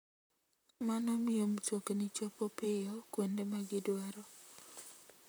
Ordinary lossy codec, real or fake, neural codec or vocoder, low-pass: none; fake; vocoder, 44.1 kHz, 128 mel bands, Pupu-Vocoder; none